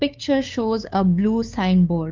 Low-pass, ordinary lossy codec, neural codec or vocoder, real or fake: 7.2 kHz; Opus, 32 kbps; codec, 16 kHz, 2 kbps, FunCodec, trained on LibriTTS, 25 frames a second; fake